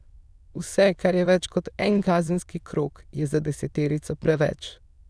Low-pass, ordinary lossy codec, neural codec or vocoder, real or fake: none; none; autoencoder, 22.05 kHz, a latent of 192 numbers a frame, VITS, trained on many speakers; fake